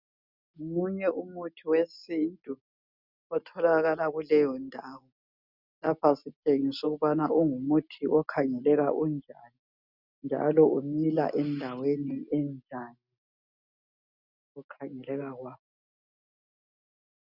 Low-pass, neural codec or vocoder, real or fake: 5.4 kHz; none; real